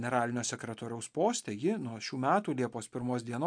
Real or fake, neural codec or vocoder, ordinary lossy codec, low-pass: real; none; MP3, 64 kbps; 9.9 kHz